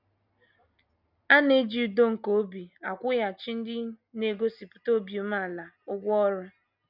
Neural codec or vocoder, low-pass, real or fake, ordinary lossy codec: none; 5.4 kHz; real; none